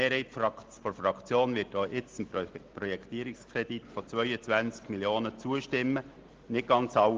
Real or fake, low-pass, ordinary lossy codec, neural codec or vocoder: real; 7.2 kHz; Opus, 16 kbps; none